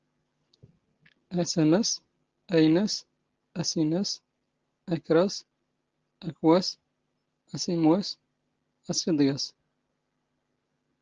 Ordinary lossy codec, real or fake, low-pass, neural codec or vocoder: Opus, 16 kbps; real; 7.2 kHz; none